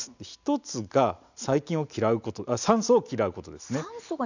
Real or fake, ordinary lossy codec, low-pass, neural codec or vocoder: real; none; 7.2 kHz; none